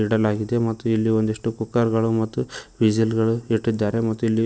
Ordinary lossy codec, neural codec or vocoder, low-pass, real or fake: none; none; none; real